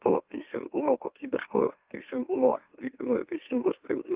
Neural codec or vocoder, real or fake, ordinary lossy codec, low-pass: autoencoder, 44.1 kHz, a latent of 192 numbers a frame, MeloTTS; fake; Opus, 32 kbps; 3.6 kHz